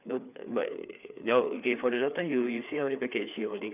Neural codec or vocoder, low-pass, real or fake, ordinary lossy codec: codec, 16 kHz, 4 kbps, FreqCodec, larger model; 3.6 kHz; fake; none